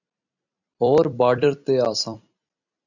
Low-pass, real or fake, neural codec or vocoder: 7.2 kHz; fake; vocoder, 44.1 kHz, 128 mel bands every 256 samples, BigVGAN v2